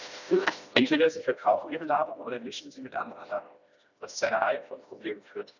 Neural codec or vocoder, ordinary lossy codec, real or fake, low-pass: codec, 16 kHz, 1 kbps, FreqCodec, smaller model; none; fake; 7.2 kHz